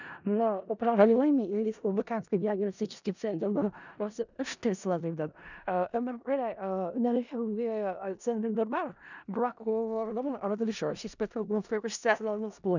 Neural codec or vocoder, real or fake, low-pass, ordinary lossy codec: codec, 16 kHz in and 24 kHz out, 0.4 kbps, LongCat-Audio-Codec, four codebook decoder; fake; 7.2 kHz; none